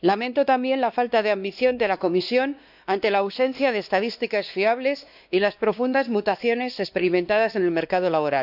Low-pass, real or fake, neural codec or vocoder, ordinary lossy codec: 5.4 kHz; fake; codec, 16 kHz, 2 kbps, X-Codec, WavLM features, trained on Multilingual LibriSpeech; none